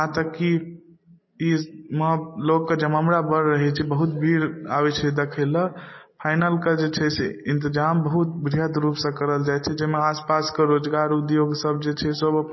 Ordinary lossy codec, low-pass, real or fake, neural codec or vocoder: MP3, 24 kbps; 7.2 kHz; real; none